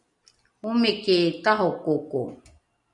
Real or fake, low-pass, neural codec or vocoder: real; 10.8 kHz; none